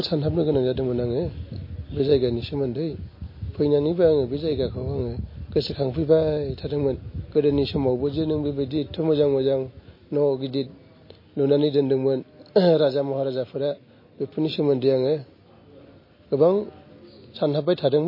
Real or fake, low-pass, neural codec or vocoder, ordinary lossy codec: real; 5.4 kHz; none; MP3, 24 kbps